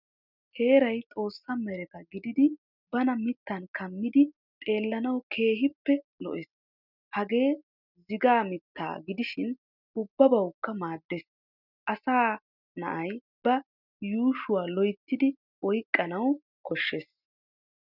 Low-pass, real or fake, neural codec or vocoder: 5.4 kHz; real; none